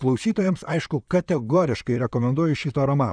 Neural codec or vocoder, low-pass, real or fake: vocoder, 44.1 kHz, 128 mel bands, Pupu-Vocoder; 9.9 kHz; fake